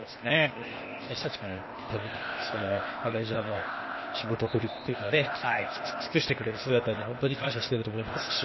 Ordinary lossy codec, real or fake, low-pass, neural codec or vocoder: MP3, 24 kbps; fake; 7.2 kHz; codec, 16 kHz, 0.8 kbps, ZipCodec